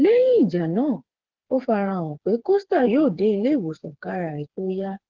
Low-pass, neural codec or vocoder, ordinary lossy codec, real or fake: 7.2 kHz; codec, 16 kHz, 4 kbps, FreqCodec, smaller model; Opus, 16 kbps; fake